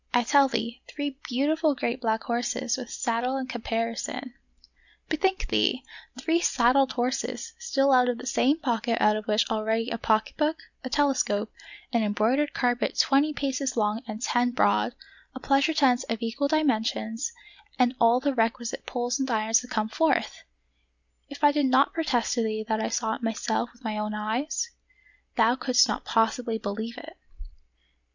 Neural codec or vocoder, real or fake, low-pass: none; real; 7.2 kHz